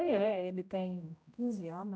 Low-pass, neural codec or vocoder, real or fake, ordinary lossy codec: none; codec, 16 kHz, 0.5 kbps, X-Codec, HuBERT features, trained on general audio; fake; none